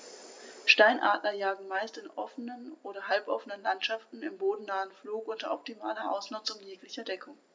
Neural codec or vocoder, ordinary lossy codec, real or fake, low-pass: none; none; real; none